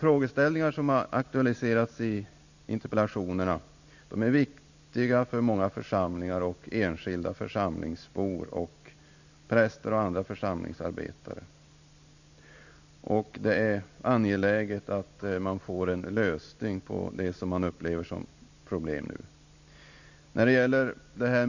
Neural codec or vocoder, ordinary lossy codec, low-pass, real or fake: none; none; 7.2 kHz; real